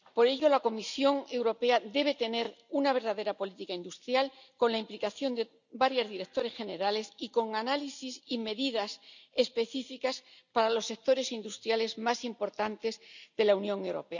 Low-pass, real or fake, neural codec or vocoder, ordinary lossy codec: 7.2 kHz; real; none; none